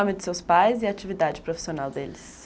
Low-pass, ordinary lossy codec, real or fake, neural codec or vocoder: none; none; real; none